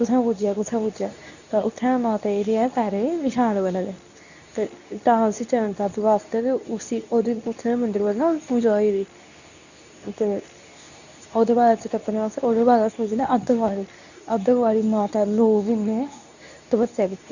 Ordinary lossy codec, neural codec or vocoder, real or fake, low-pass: none; codec, 24 kHz, 0.9 kbps, WavTokenizer, medium speech release version 2; fake; 7.2 kHz